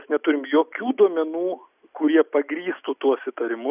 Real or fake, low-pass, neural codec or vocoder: real; 3.6 kHz; none